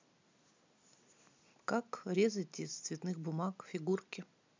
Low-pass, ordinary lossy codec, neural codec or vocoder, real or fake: 7.2 kHz; MP3, 64 kbps; none; real